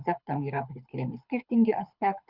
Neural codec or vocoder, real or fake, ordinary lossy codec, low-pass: codec, 24 kHz, 6 kbps, HILCodec; fake; Opus, 64 kbps; 5.4 kHz